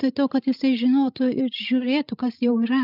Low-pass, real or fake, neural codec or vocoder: 5.4 kHz; fake; codec, 16 kHz, 16 kbps, FreqCodec, larger model